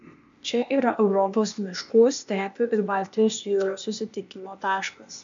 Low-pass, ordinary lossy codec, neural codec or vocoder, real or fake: 7.2 kHz; MP3, 64 kbps; codec, 16 kHz, 0.8 kbps, ZipCodec; fake